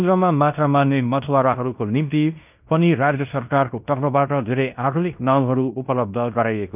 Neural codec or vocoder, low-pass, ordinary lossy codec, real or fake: codec, 16 kHz in and 24 kHz out, 0.9 kbps, LongCat-Audio-Codec, fine tuned four codebook decoder; 3.6 kHz; none; fake